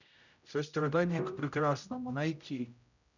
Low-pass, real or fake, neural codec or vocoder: 7.2 kHz; fake; codec, 16 kHz, 0.5 kbps, X-Codec, HuBERT features, trained on general audio